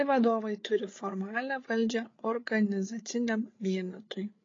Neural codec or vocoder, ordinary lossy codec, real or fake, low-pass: codec, 16 kHz, 8 kbps, FreqCodec, larger model; AAC, 32 kbps; fake; 7.2 kHz